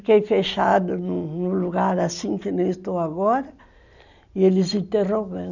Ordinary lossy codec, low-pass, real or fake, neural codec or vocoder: none; 7.2 kHz; real; none